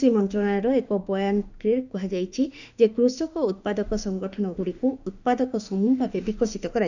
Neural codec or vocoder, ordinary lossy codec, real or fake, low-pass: autoencoder, 48 kHz, 32 numbers a frame, DAC-VAE, trained on Japanese speech; none; fake; 7.2 kHz